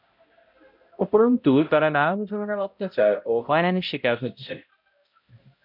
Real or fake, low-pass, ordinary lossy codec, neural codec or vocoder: fake; 5.4 kHz; MP3, 48 kbps; codec, 16 kHz, 0.5 kbps, X-Codec, HuBERT features, trained on balanced general audio